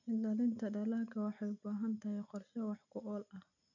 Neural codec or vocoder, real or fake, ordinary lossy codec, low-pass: none; real; none; 7.2 kHz